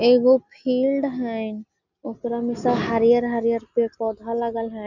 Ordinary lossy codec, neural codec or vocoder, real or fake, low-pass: none; none; real; 7.2 kHz